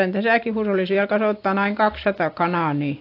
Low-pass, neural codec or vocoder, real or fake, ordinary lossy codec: 5.4 kHz; none; real; AAC, 32 kbps